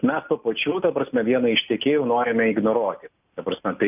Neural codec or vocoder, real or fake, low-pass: none; real; 3.6 kHz